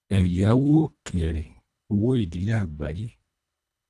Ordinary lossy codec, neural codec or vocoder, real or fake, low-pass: none; codec, 24 kHz, 1.5 kbps, HILCodec; fake; none